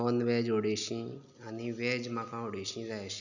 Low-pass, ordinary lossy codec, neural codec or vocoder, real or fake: 7.2 kHz; none; none; real